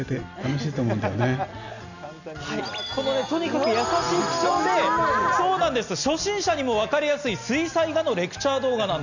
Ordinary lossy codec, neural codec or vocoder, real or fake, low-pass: none; none; real; 7.2 kHz